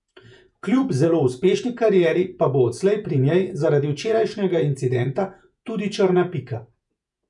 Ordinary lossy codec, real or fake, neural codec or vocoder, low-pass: none; fake; vocoder, 48 kHz, 128 mel bands, Vocos; 10.8 kHz